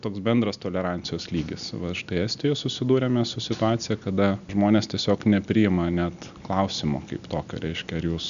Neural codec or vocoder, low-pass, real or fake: none; 7.2 kHz; real